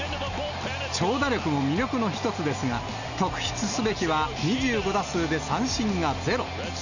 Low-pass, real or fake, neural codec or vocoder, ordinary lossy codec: 7.2 kHz; real; none; none